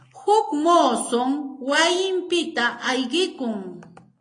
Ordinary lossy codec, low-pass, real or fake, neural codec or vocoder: AAC, 32 kbps; 9.9 kHz; real; none